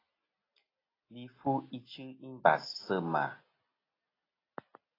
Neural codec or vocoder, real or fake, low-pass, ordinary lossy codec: none; real; 5.4 kHz; AAC, 24 kbps